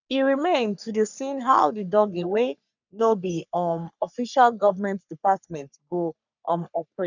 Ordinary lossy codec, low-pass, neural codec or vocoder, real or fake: none; 7.2 kHz; codec, 44.1 kHz, 3.4 kbps, Pupu-Codec; fake